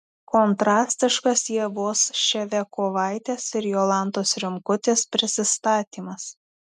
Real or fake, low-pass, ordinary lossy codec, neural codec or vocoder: real; 14.4 kHz; MP3, 96 kbps; none